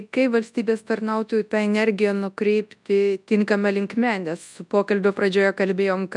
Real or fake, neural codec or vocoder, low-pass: fake; codec, 24 kHz, 0.9 kbps, WavTokenizer, large speech release; 10.8 kHz